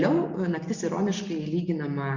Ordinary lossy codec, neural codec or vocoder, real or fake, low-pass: Opus, 64 kbps; none; real; 7.2 kHz